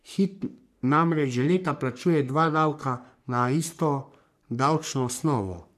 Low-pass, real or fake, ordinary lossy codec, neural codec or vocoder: 14.4 kHz; fake; none; codec, 44.1 kHz, 3.4 kbps, Pupu-Codec